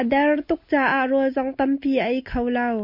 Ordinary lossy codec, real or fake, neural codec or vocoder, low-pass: MP3, 32 kbps; real; none; 5.4 kHz